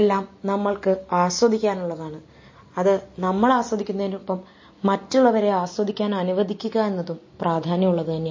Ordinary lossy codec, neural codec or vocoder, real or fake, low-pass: MP3, 32 kbps; none; real; 7.2 kHz